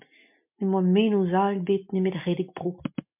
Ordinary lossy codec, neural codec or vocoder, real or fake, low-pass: MP3, 32 kbps; none; real; 3.6 kHz